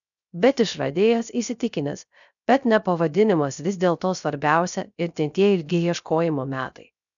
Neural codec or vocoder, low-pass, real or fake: codec, 16 kHz, 0.3 kbps, FocalCodec; 7.2 kHz; fake